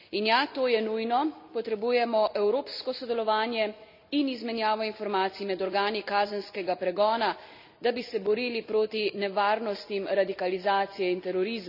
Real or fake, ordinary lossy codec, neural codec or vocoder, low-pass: real; none; none; 5.4 kHz